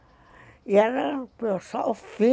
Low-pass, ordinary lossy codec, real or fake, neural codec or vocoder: none; none; real; none